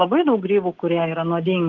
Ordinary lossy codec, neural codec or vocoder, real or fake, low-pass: Opus, 16 kbps; none; real; 7.2 kHz